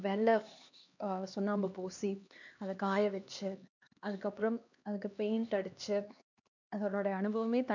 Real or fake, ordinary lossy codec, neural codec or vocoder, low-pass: fake; none; codec, 16 kHz, 2 kbps, X-Codec, HuBERT features, trained on LibriSpeech; 7.2 kHz